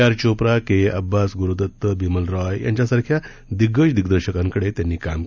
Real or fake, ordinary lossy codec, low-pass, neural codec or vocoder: real; none; 7.2 kHz; none